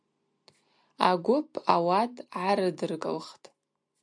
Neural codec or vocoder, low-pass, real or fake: none; 9.9 kHz; real